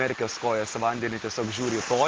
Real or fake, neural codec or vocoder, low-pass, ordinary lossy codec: real; none; 7.2 kHz; Opus, 16 kbps